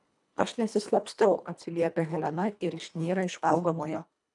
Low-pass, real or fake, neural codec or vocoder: 10.8 kHz; fake; codec, 24 kHz, 1.5 kbps, HILCodec